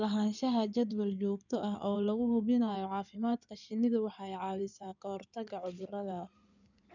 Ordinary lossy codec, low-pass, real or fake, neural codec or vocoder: none; 7.2 kHz; fake; codec, 16 kHz in and 24 kHz out, 2.2 kbps, FireRedTTS-2 codec